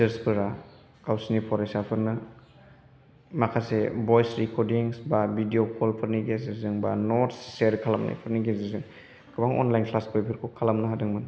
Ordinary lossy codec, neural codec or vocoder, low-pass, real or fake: none; none; none; real